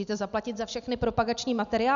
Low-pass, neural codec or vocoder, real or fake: 7.2 kHz; none; real